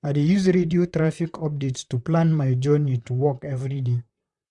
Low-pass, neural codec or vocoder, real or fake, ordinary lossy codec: 10.8 kHz; vocoder, 44.1 kHz, 128 mel bands, Pupu-Vocoder; fake; none